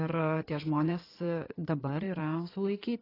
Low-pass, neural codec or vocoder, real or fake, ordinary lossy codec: 5.4 kHz; codec, 16 kHz in and 24 kHz out, 2.2 kbps, FireRedTTS-2 codec; fake; AAC, 24 kbps